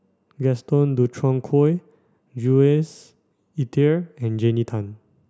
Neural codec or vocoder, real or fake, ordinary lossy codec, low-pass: none; real; none; none